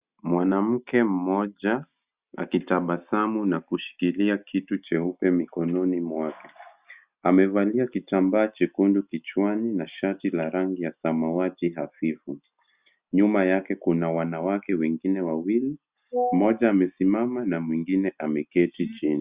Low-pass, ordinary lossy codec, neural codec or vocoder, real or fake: 3.6 kHz; Opus, 64 kbps; none; real